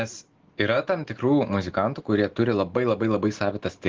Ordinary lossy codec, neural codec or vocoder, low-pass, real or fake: Opus, 16 kbps; none; 7.2 kHz; real